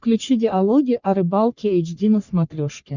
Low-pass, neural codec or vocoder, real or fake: 7.2 kHz; codec, 44.1 kHz, 3.4 kbps, Pupu-Codec; fake